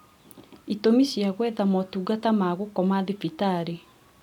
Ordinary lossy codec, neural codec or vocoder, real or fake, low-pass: none; none; real; 19.8 kHz